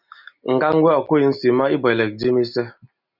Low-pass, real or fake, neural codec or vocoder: 5.4 kHz; real; none